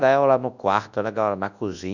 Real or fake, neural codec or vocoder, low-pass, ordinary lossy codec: fake; codec, 24 kHz, 0.9 kbps, WavTokenizer, large speech release; 7.2 kHz; none